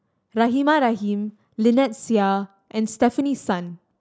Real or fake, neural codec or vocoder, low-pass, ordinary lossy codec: real; none; none; none